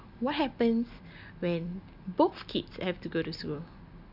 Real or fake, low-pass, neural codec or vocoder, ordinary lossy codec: real; 5.4 kHz; none; none